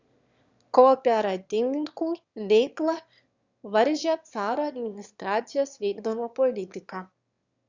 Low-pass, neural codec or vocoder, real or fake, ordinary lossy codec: 7.2 kHz; autoencoder, 22.05 kHz, a latent of 192 numbers a frame, VITS, trained on one speaker; fake; Opus, 64 kbps